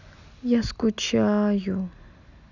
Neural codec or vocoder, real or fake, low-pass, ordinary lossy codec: none; real; 7.2 kHz; none